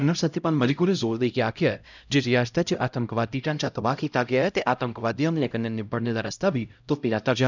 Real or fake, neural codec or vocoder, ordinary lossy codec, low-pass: fake; codec, 16 kHz, 0.5 kbps, X-Codec, HuBERT features, trained on LibriSpeech; none; 7.2 kHz